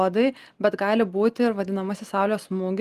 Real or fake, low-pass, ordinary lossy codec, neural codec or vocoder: real; 14.4 kHz; Opus, 24 kbps; none